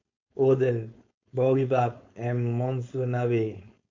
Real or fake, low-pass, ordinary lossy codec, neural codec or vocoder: fake; 7.2 kHz; MP3, 48 kbps; codec, 16 kHz, 4.8 kbps, FACodec